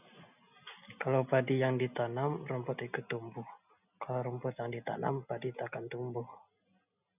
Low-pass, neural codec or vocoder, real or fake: 3.6 kHz; none; real